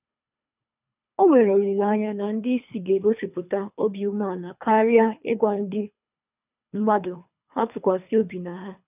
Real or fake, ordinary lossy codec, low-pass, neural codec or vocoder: fake; none; 3.6 kHz; codec, 24 kHz, 3 kbps, HILCodec